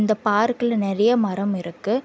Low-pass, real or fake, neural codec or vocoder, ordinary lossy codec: none; real; none; none